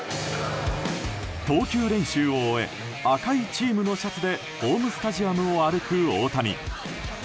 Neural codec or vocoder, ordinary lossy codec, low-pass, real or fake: none; none; none; real